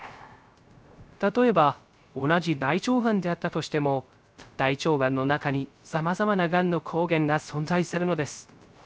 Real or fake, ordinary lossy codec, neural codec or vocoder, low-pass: fake; none; codec, 16 kHz, 0.3 kbps, FocalCodec; none